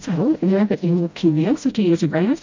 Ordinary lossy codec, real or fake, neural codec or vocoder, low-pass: MP3, 48 kbps; fake; codec, 16 kHz, 0.5 kbps, FreqCodec, smaller model; 7.2 kHz